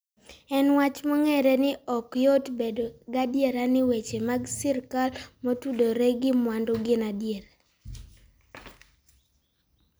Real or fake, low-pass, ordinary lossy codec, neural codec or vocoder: real; none; none; none